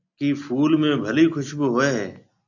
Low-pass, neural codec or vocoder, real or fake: 7.2 kHz; none; real